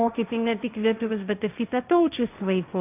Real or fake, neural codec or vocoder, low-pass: fake; codec, 16 kHz, 1.1 kbps, Voila-Tokenizer; 3.6 kHz